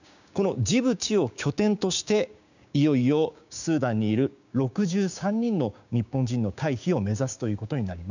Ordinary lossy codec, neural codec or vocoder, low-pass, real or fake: none; codec, 16 kHz, 6 kbps, DAC; 7.2 kHz; fake